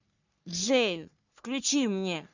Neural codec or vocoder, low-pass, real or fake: codec, 44.1 kHz, 3.4 kbps, Pupu-Codec; 7.2 kHz; fake